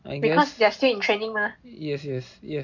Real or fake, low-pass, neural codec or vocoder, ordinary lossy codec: real; 7.2 kHz; none; none